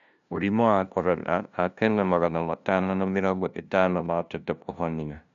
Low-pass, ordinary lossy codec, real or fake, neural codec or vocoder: 7.2 kHz; none; fake; codec, 16 kHz, 0.5 kbps, FunCodec, trained on LibriTTS, 25 frames a second